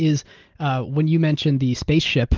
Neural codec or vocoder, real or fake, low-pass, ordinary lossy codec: none; real; 7.2 kHz; Opus, 16 kbps